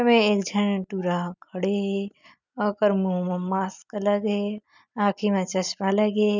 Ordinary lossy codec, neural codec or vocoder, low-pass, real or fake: none; none; 7.2 kHz; real